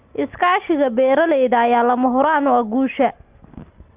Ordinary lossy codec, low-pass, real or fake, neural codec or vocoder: Opus, 32 kbps; 3.6 kHz; real; none